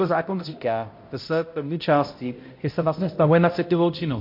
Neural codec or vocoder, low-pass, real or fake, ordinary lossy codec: codec, 16 kHz, 0.5 kbps, X-Codec, HuBERT features, trained on balanced general audio; 5.4 kHz; fake; MP3, 32 kbps